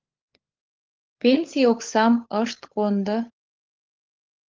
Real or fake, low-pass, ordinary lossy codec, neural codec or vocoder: fake; 7.2 kHz; Opus, 32 kbps; codec, 16 kHz, 16 kbps, FunCodec, trained on LibriTTS, 50 frames a second